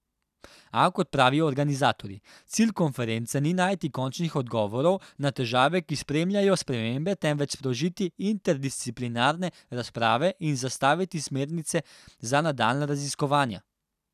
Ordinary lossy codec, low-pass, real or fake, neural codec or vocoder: none; 14.4 kHz; real; none